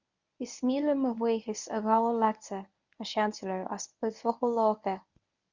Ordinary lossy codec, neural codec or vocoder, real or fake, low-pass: Opus, 64 kbps; codec, 24 kHz, 0.9 kbps, WavTokenizer, medium speech release version 1; fake; 7.2 kHz